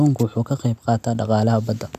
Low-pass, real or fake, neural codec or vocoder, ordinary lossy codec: 14.4 kHz; real; none; none